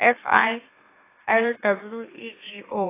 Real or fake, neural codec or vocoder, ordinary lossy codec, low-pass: fake; autoencoder, 44.1 kHz, a latent of 192 numbers a frame, MeloTTS; AAC, 16 kbps; 3.6 kHz